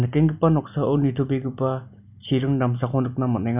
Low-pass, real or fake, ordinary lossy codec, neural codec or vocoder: 3.6 kHz; real; none; none